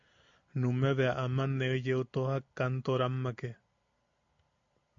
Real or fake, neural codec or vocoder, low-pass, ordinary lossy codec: real; none; 7.2 kHz; MP3, 48 kbps